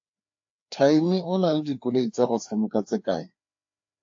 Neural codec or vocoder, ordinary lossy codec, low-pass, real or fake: codec, 16 kHz, 2 kbps, FreqCodec, larger model; AAC, 48 kbps; 7.2 kHz; fake